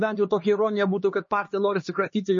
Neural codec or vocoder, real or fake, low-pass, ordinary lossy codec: codec, 16 kHz, 2 kbps, X-Codec, HuBERT features, trained on LibriSpeech; fake; 7.2 kHz; MP3, 32 kbps